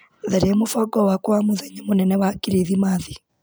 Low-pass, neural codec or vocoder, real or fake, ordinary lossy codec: none; none; real; none